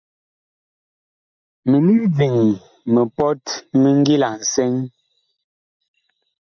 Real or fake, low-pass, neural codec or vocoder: real; 7.2 kHz; none